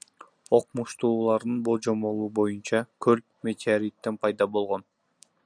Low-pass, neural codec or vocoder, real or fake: 9.9 kHz; none; real